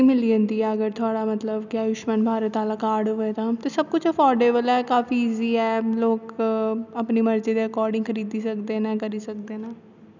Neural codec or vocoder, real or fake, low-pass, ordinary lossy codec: none; real; 7.2 kHz; none